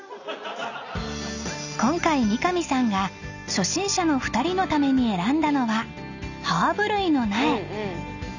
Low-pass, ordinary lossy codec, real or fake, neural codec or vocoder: 7.2 kHz; none; real; none